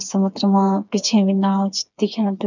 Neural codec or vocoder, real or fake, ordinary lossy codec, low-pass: codec, 16 kHz, 4 kbps, FreqCodec, smaller model; fake; none; 7.2 kHz